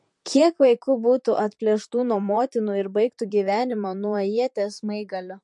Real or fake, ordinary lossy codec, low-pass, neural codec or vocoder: fake; MP3, 48 kbps; 10.8 kHz; vocoder, 44.1 kHz, 128 mel bands, Pupu-Vocoder